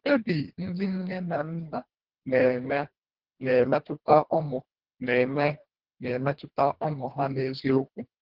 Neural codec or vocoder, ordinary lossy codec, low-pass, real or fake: codec, 24 kHz, 1.5 kbps, HILCodec; Opus, 16 kbps; 5.4 kHz; fake